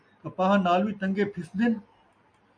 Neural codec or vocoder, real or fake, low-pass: none; real; 9.9 kHz